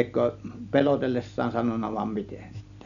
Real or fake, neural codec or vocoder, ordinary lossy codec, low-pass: real; none; none; 7.2 kHz